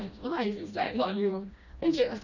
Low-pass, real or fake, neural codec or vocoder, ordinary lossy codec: 7.2 kHz; fake; codec, 16 kHz, 1 kbps, FreqCodec, smaller model; none